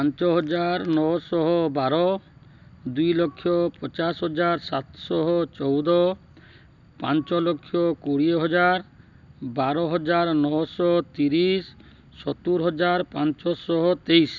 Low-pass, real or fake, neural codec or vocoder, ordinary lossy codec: 7.2 kHz; real; none; none